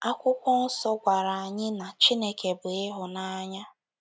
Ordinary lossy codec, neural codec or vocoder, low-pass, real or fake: none; none; none; real